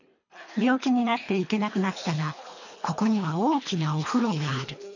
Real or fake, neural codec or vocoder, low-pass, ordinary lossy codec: fake; codec, 24 kHz, 3 kbps, HILCodec; 7.2 kHz; none